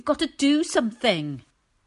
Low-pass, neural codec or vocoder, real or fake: 10.8 kHz; none; real